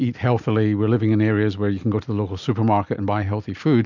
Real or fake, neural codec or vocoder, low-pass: real; none; 7.2 kHz